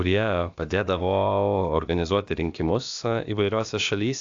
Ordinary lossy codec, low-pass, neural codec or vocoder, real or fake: AAC, 64 kbps; 7.2 kHz; codec, 16 kHz, about 1 kbps, DyCAST, with the encoder's durations; fake